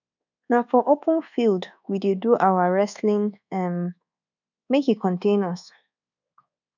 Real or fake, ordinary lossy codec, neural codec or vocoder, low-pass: fake; none; codec, 24 kHz, 1.2 kbps, DualCodec; 7.2 kHz